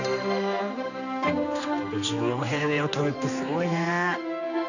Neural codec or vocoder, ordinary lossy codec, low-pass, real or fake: codec, 16 kHz, 2 kbps, X-Codec, HuBERT features, trained on general audio; none; 7.2 kHz; fake